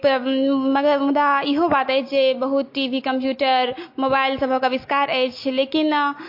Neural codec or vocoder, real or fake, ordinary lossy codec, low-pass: none; real; MP3, 32 kbps; 5.4 kHz